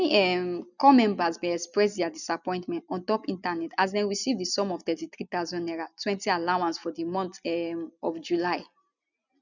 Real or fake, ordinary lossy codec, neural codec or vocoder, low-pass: real; none; none; 7.2 kHz